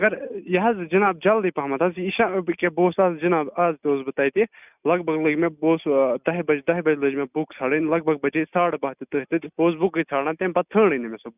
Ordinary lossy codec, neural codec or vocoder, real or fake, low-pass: none; none; real; 3.6 kHz